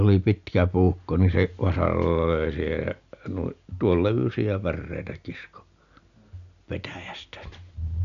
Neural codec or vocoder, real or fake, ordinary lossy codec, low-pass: none; real; MP3, 96 kbps; 7.2 kHz